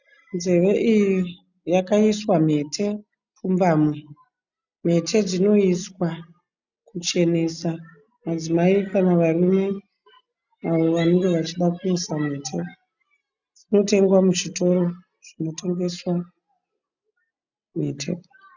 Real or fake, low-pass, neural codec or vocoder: real; 7.2 kHz; none